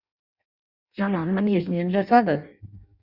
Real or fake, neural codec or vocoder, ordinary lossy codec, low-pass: fake; codec, 16 kHz in and 24 kHz out, 0.6 kbps, FireRedTTS-2 codec; Opus, 64 kbps; 5.4 kHz